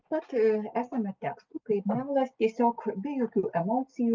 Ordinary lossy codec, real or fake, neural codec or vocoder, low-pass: Opus, 24 kbps; real; none; 7.2 kHz